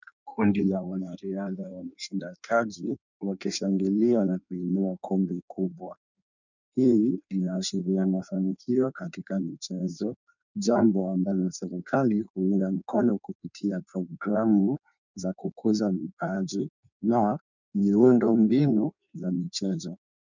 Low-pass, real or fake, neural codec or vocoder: 7.2 kHz; fake; codec, 16 kHz in and 24 kHz out, 1.1 kbps, FireRedTTS-2 codec